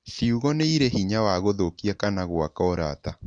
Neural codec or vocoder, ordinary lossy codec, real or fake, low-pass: none; MP3, 64 kbps; real; 9.9 kHz